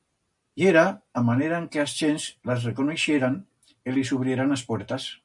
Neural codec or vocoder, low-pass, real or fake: none; 10.8 kHz; real